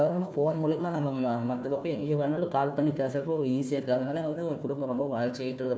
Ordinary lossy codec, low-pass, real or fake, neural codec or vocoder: none; none; fake; codec, 16 kHz, 1 kbps, FunCodec, trained on Chinese and English, 50 frames a second